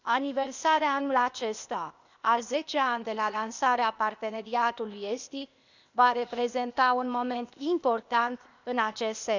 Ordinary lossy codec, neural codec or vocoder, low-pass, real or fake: none; codec, 16 kHz, 0.8 kbps, ZipCodec; 7.2 kHz; fake